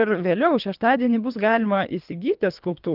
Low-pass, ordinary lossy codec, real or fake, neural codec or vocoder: 5.4 kHz; Opus, 24 kbps; fake; codec, 24 kHz, 3 kbps, HILCodec